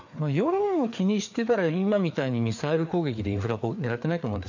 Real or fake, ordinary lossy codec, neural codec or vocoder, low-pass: fake; MP3, 64 kbps; codec, 16 kHz, 4 kbps, FreqCodec, larger model; 7.2 kHz